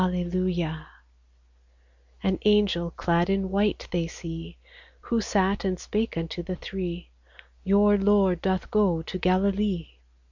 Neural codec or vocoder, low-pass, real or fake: none; 7.2 kHz; real